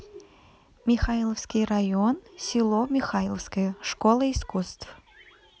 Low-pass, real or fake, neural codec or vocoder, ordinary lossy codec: none; real; none; none